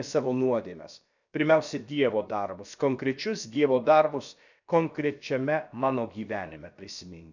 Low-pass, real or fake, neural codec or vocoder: 7.2 kHz; fake; codec, 16 kHz, about 1 kbps, DyCAST, with the encoder's durations